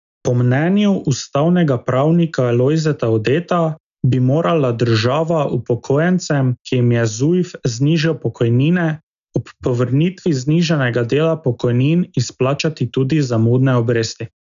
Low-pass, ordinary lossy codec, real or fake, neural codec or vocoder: 7.2 kHz; none; real; none